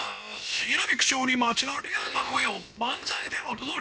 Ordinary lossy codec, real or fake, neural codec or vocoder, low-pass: none; fake; codec, 16 kHz, about 1 kbps, DyCAST, with the encoder's durations; none